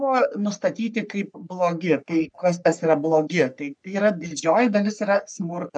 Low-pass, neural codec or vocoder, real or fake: 9.9 kHz; codec, 44.1 kHz, 3.4 kbps, Pupu-Codec; fake